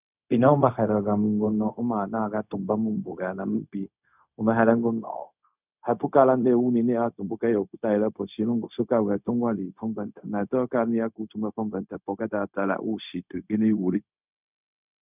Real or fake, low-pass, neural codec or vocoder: fake; 3.6 kHz; codec, 16 kHz, 0.4 kbps, LongCat-Audio-Codec